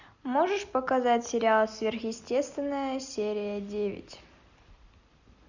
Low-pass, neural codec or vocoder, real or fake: 7.2 kHz; none; real